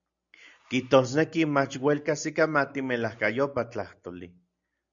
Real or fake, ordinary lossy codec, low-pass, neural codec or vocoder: real; MP3, 96 kbps; 7.2 kHz; none